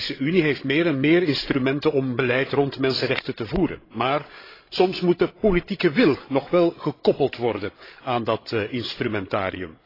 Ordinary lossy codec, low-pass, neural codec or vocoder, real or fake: AAC, 24 kbps; 5.4 kHz; codec, 16 kHz, 16 kbps, FreqCodec, larger model; fake